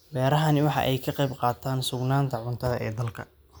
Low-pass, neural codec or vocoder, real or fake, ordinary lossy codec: none; none; real; none